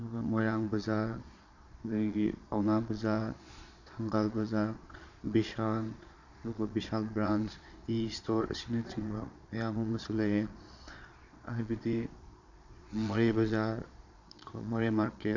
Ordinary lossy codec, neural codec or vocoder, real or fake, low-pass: none; vocoder, 22.05 kHz, 80 mel bands, Vocos; fake; 7.2 kHz